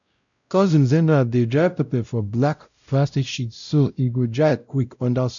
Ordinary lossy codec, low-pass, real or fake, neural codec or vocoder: none; 7.2 kHz; fake; codec, 16 kHz, 0.5 kbps, X-Codec, WavLM features, trained on Multilingual LibriSpeech